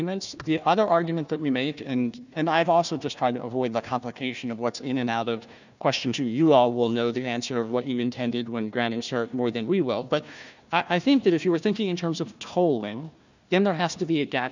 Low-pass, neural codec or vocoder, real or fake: 7.2 kHz; codec, 16 kHz, 1 kbps, FunCodec, trained on Chinese and English, 50 frames a second; fake